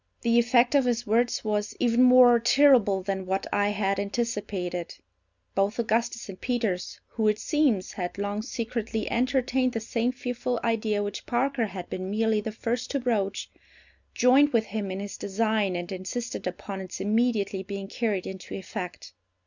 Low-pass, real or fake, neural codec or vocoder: 7.2 kHz; real; none